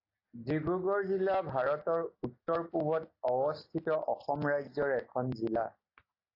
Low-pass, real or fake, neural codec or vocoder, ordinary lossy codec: 5.4 kHz; real; none; AAC, 32 kbps